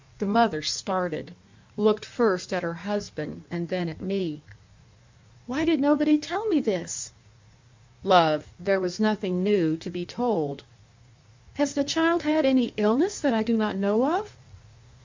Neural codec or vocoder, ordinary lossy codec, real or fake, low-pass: codec, 16 kHz in and 24 kHz out, 1.1 kbps, FireRedTTS-2 codec; MP3, 64 kbps; fake; 7.2 kHz